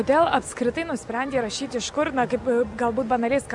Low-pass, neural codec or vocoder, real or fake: 10.8 kHz; none; real